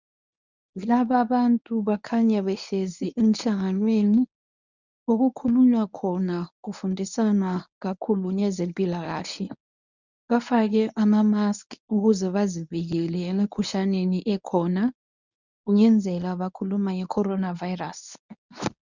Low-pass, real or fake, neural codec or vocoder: 7.2 kHz; fake; codec, 24 kHz, 0.9 kbps, WavTokenizer, medium speech release version 1